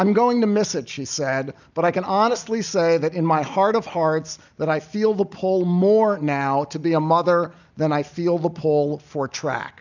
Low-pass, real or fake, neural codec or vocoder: 7.2 kHz; real; none